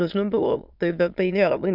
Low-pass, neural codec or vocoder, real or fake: 5.4 kHz; autoencoder, 22.05 kHz, a latent of 192 numbers a frame, VITS, trained on many speakers; fake